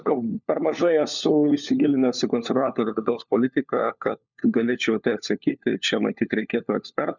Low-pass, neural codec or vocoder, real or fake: 7.2 kHz; codec, 16 kHz, 4 kbps, FunCodec, trained on LibriTTS, 50 frames a second; fake